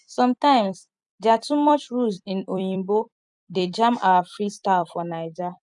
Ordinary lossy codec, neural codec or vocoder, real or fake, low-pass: none; vocoder, 44.1 kHz, 128 mel bands every 256 samples, BigVGAN v2; fake; 10.8 kHz